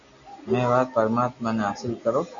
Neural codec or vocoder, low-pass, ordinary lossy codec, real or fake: none; 7.2 kHz; AAC, 64 kbps; real